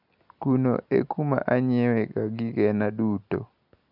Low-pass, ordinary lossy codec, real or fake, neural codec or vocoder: 5.4 kHz; none; real; none